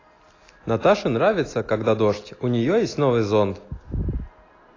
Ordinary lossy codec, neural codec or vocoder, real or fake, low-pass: AAC, 32 kbps; none; real; 7.2 kHz